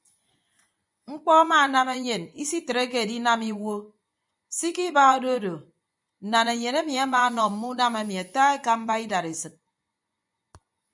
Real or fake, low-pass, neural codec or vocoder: fake; 10.8 kHz; vocoder, 24 kHz, 100 mel bands, Vocos